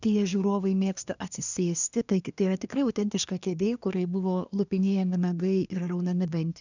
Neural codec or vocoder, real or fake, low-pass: codec, 24 kHz, 1 kbps, SNAC; fake; 7.2 kHz